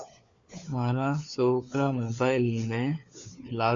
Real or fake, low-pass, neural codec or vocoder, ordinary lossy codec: fake; 7.2 kHz; codec, 16 kHz, 4 kbps, FunCodec, trained on LibriTTS, 50 frames a second; AAC, 64 kbps